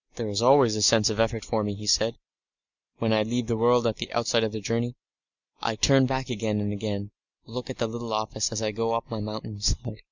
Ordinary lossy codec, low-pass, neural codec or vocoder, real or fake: Opus, 64 kbps; 7.2 kHz; none; real